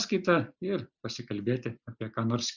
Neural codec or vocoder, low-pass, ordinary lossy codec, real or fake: none; 7.2 kHz; Opus, 64 kbps; real